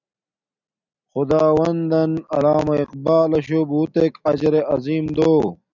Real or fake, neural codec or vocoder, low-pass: real; none; 7.2 kHz